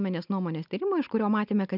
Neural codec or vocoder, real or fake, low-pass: none; real; 5.4 kHz